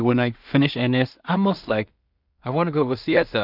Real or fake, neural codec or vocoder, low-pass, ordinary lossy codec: fake; codec, 16 kHz in and 24 kHz out, 0.4 kbps, LongCat-Audio-Codec, two codebook decoder; 5.4 kHz; none